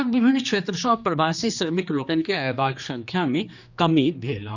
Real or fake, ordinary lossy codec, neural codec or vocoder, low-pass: fake; none; codec, 16 kHz, 2 kbps, X-Codec, HuBERT features, trained on general audio; 7.2 kHz